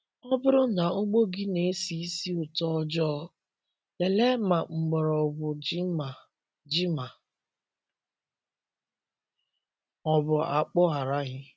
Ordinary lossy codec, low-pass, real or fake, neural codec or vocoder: none; none; real; none